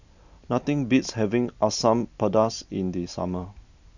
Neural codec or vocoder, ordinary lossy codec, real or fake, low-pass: none; none; real; 7.2 kHz